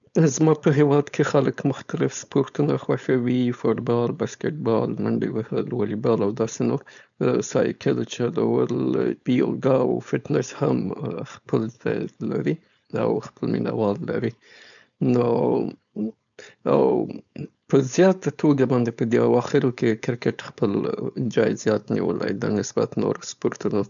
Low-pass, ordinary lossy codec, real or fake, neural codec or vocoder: 7.2 kHz; none; fake; codec, 16 kHz, 4.8 kbps, FACodec